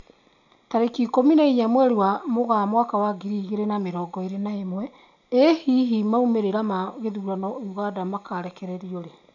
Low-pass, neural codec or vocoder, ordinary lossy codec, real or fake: 7.2 kHz; none; none; real